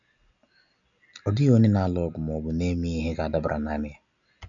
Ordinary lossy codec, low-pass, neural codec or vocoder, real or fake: none; 7.2 kHz; none; real